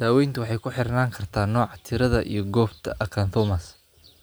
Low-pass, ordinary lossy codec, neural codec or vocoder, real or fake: none; none; none; real